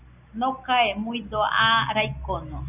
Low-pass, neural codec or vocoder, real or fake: 3.6 kHz; none; real